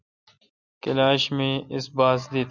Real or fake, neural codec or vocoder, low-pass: real; none; 7.2 kHz